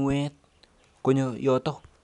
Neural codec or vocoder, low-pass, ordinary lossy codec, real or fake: none; 10.8 kHz; none; real